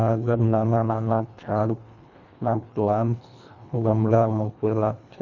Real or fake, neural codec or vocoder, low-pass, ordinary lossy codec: fake; codec, 24 kHz, 1.5 kbps, HILCodec; 7.2 kHz; none